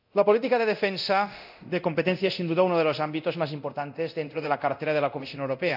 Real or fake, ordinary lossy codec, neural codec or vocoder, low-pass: fake; none; codec, 24 kHz, 0.9 kbps, DualCodec; 5.4 kHz